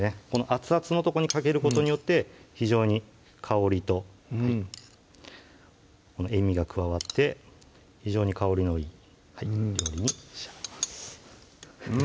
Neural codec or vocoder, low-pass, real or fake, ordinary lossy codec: none; none; real; none